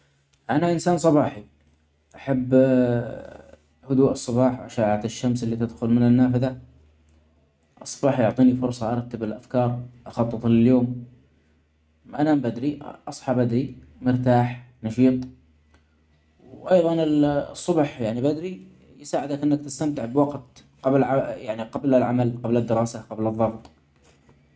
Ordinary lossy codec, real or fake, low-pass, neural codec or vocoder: none; real; none; none